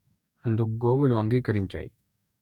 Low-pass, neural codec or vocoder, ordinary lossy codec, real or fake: 19.8 kHz; codec, 44.1 kHz, 2.6 kbps, DAC; none; fake